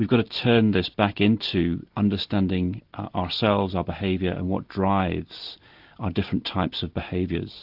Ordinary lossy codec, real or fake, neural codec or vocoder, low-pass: AAC, 48 kbps; real; none; 5.4 kHz